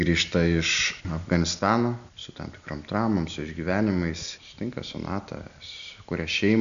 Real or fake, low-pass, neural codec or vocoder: real; 7.2 kHz; none